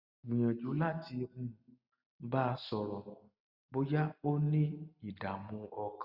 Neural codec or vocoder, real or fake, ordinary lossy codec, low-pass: none; real; Opus, 64 kbps; 5.4 kHz